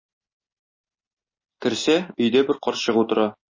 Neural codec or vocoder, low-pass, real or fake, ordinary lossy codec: none; 7.2 kHz; real; MP3, 32 kbps